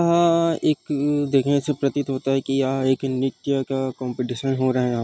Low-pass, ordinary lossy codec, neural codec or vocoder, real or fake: none; none; none; real